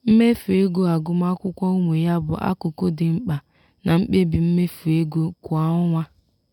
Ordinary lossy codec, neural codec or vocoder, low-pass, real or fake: none; none; 19.8 kHz; real